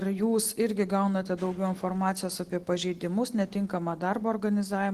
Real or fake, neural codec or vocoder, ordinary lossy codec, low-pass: real; none; Opus, 16 kbps; 14.4 kHz